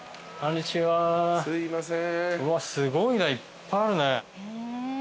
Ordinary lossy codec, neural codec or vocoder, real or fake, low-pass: none; none; real; none